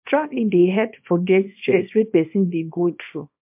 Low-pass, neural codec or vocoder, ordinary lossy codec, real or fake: 3.6 kHz; codec, 24 kHz, 0.9 kbps, WavTokenizer, small release; MP3, 32 kbps; fake